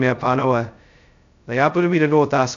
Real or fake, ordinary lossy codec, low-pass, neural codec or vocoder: fake; AAC, 64 kbps; 7.2 kHz; codec, 16 kHz, 0.2 kbps, FocalCodec